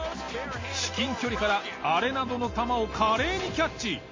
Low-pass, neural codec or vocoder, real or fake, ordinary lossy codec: 7.2 kHz; none; real; MP3, 32 kbps